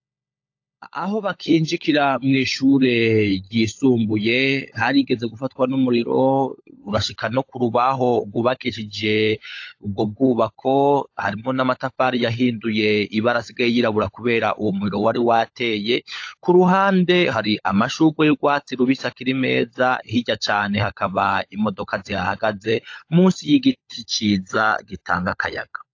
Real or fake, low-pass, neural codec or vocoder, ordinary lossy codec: fake; 7.2 kHz; codec, 16 kHz, 16 kbps, FunCodec, trained on LibriTTS, 50 frames a second; AAC, 48 kbps